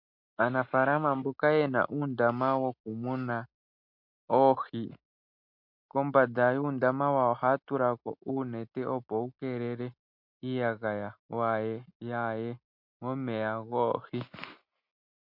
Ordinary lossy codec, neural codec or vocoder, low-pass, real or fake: MP3, 48 kbps; none; 5.4 kHz; real